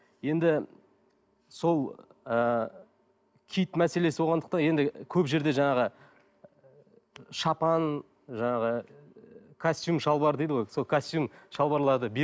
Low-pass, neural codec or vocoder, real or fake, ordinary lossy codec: none; none; real; none